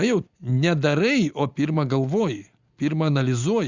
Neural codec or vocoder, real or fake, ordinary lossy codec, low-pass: none; real; Opus, 64 kbps; 7.2 kHz